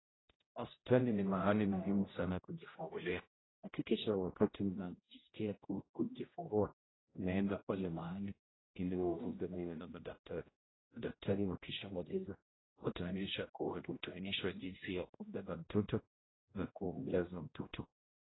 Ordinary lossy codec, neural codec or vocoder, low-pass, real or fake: AAC, 16 kbps; codec, 16 kHz, 0.5 kbps, X-Codec, HuBERT features, trained on general audio; 7.2 kHz; fake